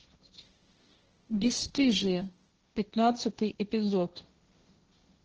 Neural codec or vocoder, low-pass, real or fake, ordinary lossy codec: codec, 16 kHz, 1.1 kbps, Voila-Tokenizer; 7.2 kHz; fake; Opus, 16 kbps